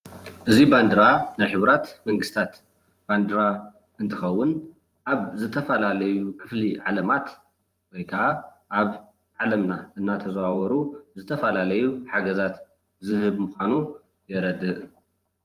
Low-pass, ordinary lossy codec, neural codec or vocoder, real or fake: 14.4 kHz; Opus, 32 kbps; vocoder, 44.1 kHz, 128 mel bands every 512 samples, BigVGAN v2; fake